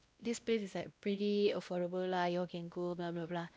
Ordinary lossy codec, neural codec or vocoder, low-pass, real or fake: none; codec, 16 kHz, 1 kbps, X-Codec, WavLM features, trained on Multilingual LibriSpeech; none; fake